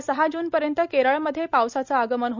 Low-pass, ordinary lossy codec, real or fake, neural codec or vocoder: 7.2 kHz; none; real; none